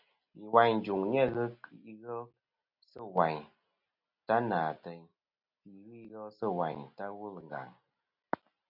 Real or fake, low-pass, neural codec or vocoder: real; 5.4 kHz; none